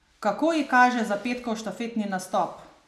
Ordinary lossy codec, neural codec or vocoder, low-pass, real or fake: none; none; 14.4 kHz; real